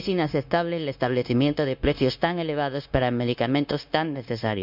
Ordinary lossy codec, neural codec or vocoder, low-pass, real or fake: none; codec, 16 kHz, 0.9 kbps, LongCat-Audio-Codec; 5.4 kHz; fake